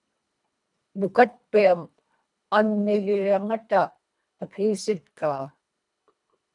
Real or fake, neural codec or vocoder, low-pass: fake; codec, 24 kHz, 1.5 kbps, HILCodec; 10.8 kHz